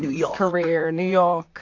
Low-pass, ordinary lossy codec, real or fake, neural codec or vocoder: 7.2 kHz; AAC, 48 kbps; fake; vocoder, 44.1 kHz, 128 mel bands, Pupu-Vocoder